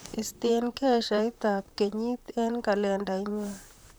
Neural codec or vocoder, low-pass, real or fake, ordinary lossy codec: vocoder, 44.1 kHz, 128 mel bands, Pupu-Vocoder; none; fake; none